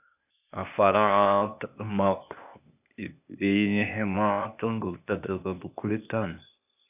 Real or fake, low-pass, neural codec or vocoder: fake; 3.6 kHz; codec, 16 kHz, 0.8 kbps, ZipCodec